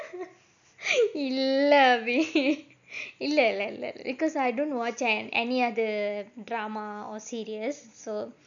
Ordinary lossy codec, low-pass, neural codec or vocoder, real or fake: none; 7.2 kHz; none; real